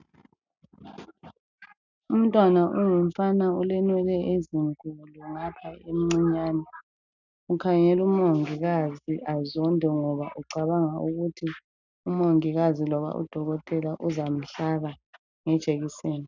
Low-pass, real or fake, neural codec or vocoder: 7.2 kHz; real; none